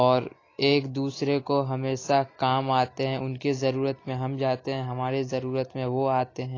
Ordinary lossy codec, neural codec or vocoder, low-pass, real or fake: AAC, 32 kbps; none; 7.2 kHz; real